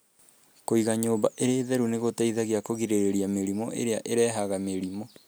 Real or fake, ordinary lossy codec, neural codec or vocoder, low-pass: real; none; none; none